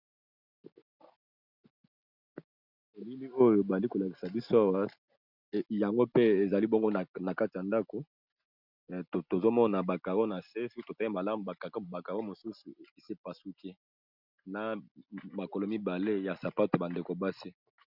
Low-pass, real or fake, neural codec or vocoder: 5.4 kHz; real; none